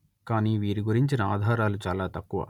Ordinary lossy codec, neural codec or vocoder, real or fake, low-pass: none; none; real; 19.8 kHz